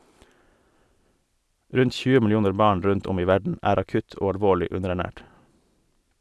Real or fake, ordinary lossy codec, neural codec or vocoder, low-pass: real; none; none; none